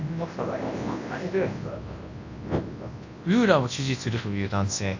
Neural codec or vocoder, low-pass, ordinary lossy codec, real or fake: codec, 24 kHz, 0.9 kbps, WavTokenizer, large speech release; 7.2 kHz; AAC, 48 kbps; fake